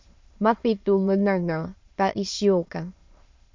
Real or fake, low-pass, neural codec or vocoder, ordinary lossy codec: fake; 7.2 kHz; autoencoder, 22.05 kHz, a latent of 192 numbers a frame, VITS, trained on many speakers; MP3, 48 kbps